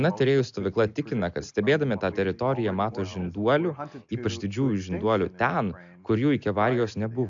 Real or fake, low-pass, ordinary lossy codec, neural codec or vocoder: real; 7.2 kHz; AAC, 64 kbps; none